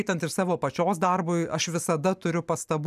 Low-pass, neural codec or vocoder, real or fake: 14.4 kHz; none; real